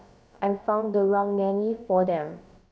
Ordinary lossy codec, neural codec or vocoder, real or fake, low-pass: none; codec, 16 kHz, about 1 kbps, DyCAST, with the encoder's durations; fake; none